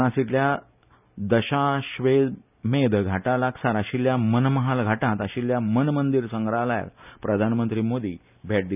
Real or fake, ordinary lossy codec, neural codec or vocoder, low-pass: real; none; none; 3.6 kHz